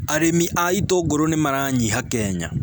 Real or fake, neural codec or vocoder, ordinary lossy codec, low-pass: real; none; none; none